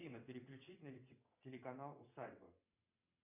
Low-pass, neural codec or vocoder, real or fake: 3.6 kHz; vocoder, 22.05 kHz, 80 mel bands, WaveNeXt; fake